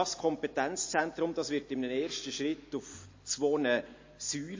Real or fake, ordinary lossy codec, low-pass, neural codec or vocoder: real; MP3, 32 kbps; 7.2 kHz; none